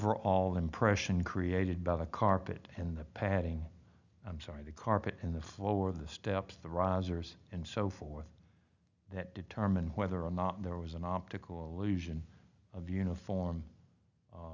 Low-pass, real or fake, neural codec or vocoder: 7.2 kHz; real; none